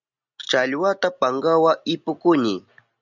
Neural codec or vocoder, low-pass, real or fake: none; 7.2 kHz; real